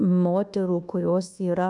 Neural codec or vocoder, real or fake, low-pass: codec, 24 kHz, 1.2 kbps, DualCodec; fake; 10.8 kHz